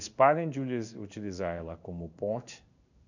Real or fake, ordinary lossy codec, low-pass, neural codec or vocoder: fake; none; 7.2 kHz; codec, 16 kHz in and 24 kHz out, 1 kbps, XY-Tokenizer